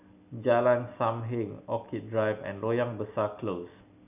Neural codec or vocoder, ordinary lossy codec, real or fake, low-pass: none; none; real; 3.6 kHz